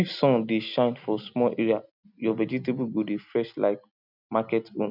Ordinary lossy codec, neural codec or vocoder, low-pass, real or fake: none; none; 5.4 kHz; real